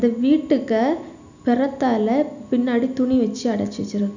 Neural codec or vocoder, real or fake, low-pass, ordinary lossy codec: none; real; 7.2 kHz; none